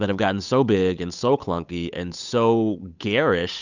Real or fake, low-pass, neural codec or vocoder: fake; 7.2 kHz; codec, 16 kHz, 8 kbps, FunCodec, trained on Chinese and English, 25 frames a second